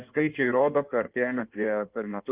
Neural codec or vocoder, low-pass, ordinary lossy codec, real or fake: codec, 16 kHz in and 24 kHz out, 1.1 kbps, FireRedTTS-2 codec; 3.6 kHz; Opus, 32 kbps; fake